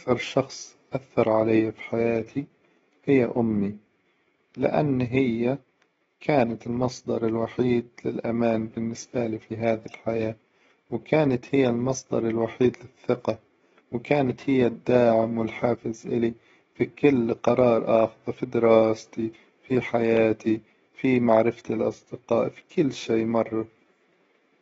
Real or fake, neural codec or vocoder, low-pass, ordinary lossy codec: fake; autoencoder, 48 kHz, 128 numbers a frame, DAC-VAE, trained on Japanese speech; 19.8 kHz; AAC, 24 kbps